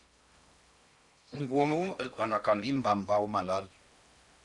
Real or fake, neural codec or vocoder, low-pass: fake; codec, 16 kHz in and 24 kHz out, 0.6 kbps, FocalCodec, streaming, 4096 codes; 10.8 kHz